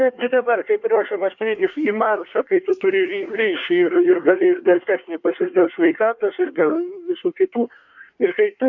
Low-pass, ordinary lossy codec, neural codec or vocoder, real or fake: 7.2 kHz; MP3, 48 kbps; codec, 24 kHz, 1 kbps, SNAC; fake